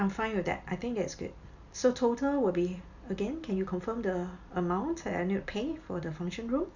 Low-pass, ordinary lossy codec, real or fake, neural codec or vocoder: 7.2 kHz; none; real; none